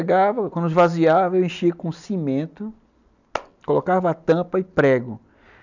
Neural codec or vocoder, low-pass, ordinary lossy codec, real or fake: none; 7.2 kHz; none; real